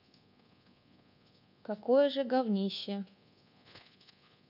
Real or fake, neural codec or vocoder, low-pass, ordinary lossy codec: fake; codec, 24 kHz, 1.2 kbps, DualCodec; 5.4 kHz; none